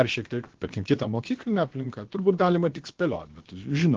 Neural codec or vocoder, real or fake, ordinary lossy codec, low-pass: codec, 16 kHz, about 1 kbps, DyCAST, with the encoder's durations; fake; Opus, 16 kbps; 7.2 kHz